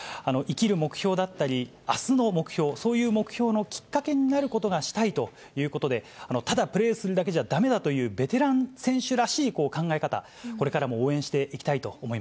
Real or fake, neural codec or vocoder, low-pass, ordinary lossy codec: real; none; none; none